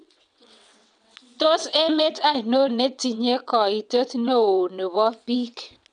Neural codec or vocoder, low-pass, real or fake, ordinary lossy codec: vocoder, 22.05 kHz, 80 mel bands, WaveNeXt; 9.9 kHz; fake; none